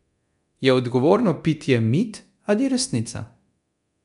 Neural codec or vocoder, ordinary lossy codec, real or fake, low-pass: codec, 24 kHz, 0.9 kbps, DualCodec; none; fake; 10.8 kHz